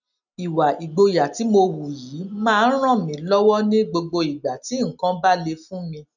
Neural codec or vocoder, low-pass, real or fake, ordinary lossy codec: none; 7.2 kHz; real; none